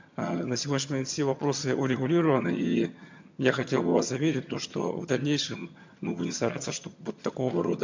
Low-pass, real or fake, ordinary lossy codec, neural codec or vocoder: 7.2 kHz; fake; MP3, 48 kbps; vocoder, 22.05 kHz, 80 mel bands, HiFi-GAN